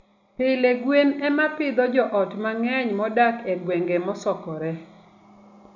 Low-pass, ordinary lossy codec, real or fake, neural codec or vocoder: 7.2 kHz; none; real; none